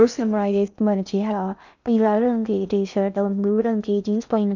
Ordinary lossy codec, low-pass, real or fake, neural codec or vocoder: none; 7.2 kHz; fake; codec, 16 kHz in and 24 kHz out, 0.6 kbps, FocalCodec, streaming, 2048 codes